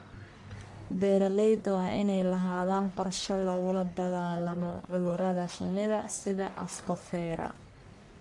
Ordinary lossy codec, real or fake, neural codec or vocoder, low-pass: MP3, 64 kbps; fake; codec, 44.1 kHz, 1.7 kbps, Pupu-Codec; 10.8 kHz